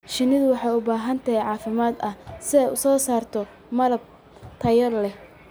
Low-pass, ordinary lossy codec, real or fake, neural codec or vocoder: none; none; real; none